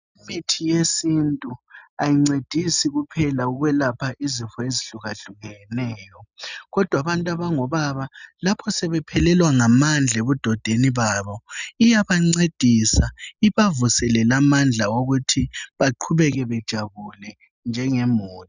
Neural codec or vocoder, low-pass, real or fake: none; 7.2 kHz; real